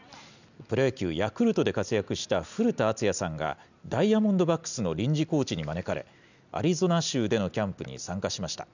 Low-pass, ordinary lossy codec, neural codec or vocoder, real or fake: 7.2 kHz; none; none; real